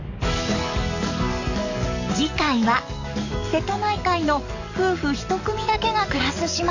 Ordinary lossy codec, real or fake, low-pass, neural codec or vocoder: none; fake; 7.2 kHz; codec, 44.1 kHz, 7.8 kbps, DAC